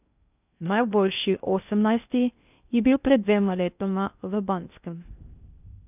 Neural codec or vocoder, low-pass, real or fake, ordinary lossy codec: codec, 16 kHz in and 24 kHz out, 0.6 kbps, FocalCodec, streaming, 2048 codes; 3.6 kHz; fake; none